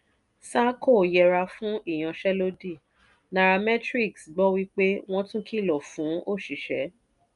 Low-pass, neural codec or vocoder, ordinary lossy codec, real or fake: 10.8 kHz; none; none; real